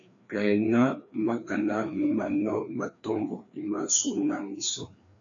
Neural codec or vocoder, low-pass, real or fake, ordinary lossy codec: codec, 16 kHz, 2 kbps, FreqCodec, larger model; 7.2 kHz; fake; MP3, 64 kbps